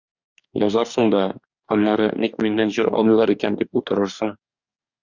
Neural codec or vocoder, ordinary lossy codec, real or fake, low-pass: codec, 44.1 kHz, 2.6 kbps, DAC; Opus, 64 kbps; fake; 7.2 kHz